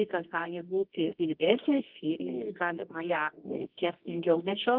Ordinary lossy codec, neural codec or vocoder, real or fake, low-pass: Opus, 24 kbps; codec, 24 kHz, 0.9 kbps, WavTokenizer, medium music audio release; fake; 5.4 kHz